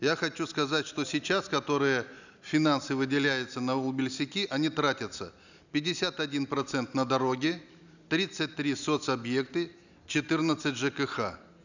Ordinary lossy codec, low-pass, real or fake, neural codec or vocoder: none; 7.2 kHz; real; none